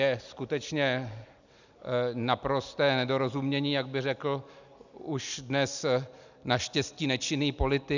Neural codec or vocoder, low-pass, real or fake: none; 7.2 kHz; real